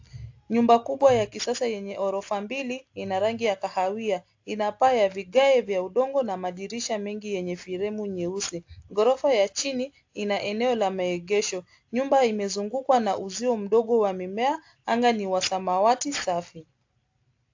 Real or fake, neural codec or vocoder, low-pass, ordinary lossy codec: real; none; 7.2 kHz; AAC, 48 kbps